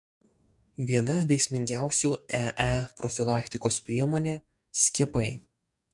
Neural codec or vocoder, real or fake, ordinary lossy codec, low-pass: codec, 44.1 kHz, 2.6 kbps, DAC; fake; MP3, 64 kbps; 10.8 kHz